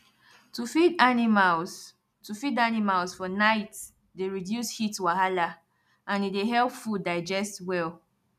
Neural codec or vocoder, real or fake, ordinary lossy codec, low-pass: none; real; none; 14.4 kHz